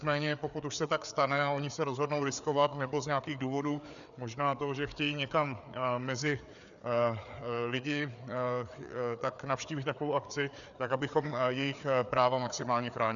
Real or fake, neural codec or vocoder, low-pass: fake; codec, 16 kHz, 4 kbps, FreqCodec, larger model; 7.2 kHz